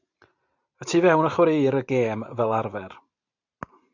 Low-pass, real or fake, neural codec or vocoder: 7.2 kHz; fake; vocoder, 44.1 kHz, 128 mel bands every 512 samples, BigVGAN v2